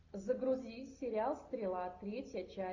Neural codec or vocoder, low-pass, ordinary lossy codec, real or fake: none; 7.2 kHz; AAC, 48 kbps; real